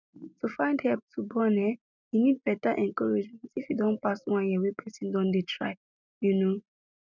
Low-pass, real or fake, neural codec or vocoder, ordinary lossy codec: 7.2 kHz; real; none; none